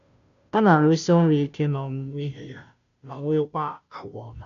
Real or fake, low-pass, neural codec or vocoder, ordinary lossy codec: fake; 7.2 kHz; codec, 16 kHz, 0.5 kbps, FunCodec, trained on Chinese and English, 25 frames a second; none